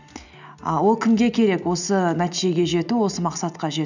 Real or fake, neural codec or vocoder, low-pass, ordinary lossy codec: real; none; 7.2 kHz; none